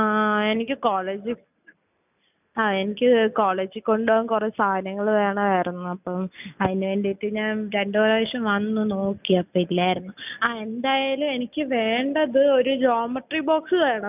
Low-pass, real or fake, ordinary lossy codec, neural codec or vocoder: 3.6 kHz; real; none; none